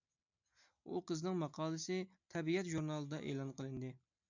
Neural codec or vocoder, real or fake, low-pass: none; real; 7.2 kHz